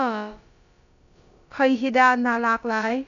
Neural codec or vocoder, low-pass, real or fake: codec, 16 kHz, about 1 kbps, DyCAST, with the encoder's durations; 7.2 kHz; fake